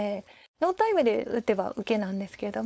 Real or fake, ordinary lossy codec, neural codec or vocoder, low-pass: fake; none; codec, 16 kHz, 4.8 kbps, FACodec; none